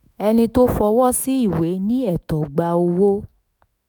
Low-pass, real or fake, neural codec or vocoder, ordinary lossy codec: none; fake; autoencoder, 48 kHz, 128 numbers a frame, DAC-VAE, trained on Japanese speech; none